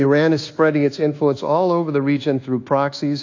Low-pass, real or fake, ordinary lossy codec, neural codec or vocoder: 7.2 kHz; fake; AAC, 48 kbps; codec, 24 kHz, 1.2 kbps, DualCodec